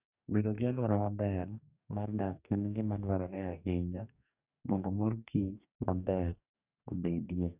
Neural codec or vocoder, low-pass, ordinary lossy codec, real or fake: codec, 44.1 kHz, 2.6 kbps, DAC; 3.6 kHz; none; fake